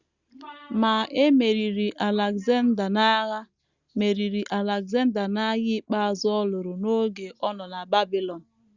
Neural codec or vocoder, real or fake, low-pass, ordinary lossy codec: none; real; 7.2 kHz; Opus, 64 kbps